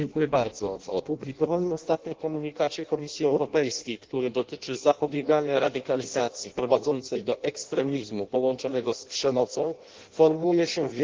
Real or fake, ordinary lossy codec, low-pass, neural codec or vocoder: fake; Opus, 16 kbps; 7.2 kHz; codec, 16 kHz in and 24 kHz out, 0.6 kbps, FireRedTTS-2 codec